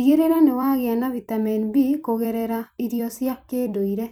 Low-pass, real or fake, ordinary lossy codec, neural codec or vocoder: none; fake; none; vocoder, 44.1 kHz, 128 mel bands every 256 samples, BigVGAN v2